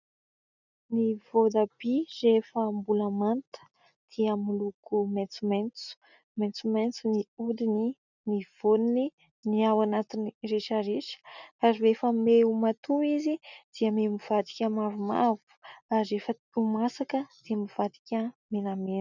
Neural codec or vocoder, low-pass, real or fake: none; 7.2 kHz; real